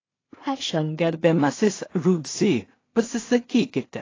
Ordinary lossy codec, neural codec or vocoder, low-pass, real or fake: AAC, 32 kbps; codec, 16 kHz in and 24 kHz out, 0.4 kbps, LongCat-Audio-Codec, two codebook decoder; 7.2 kHz; fake